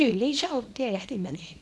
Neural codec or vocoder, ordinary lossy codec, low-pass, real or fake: codec, 24 kHz, 0.9 kbps, WavTokenizer, small release; none; none; fake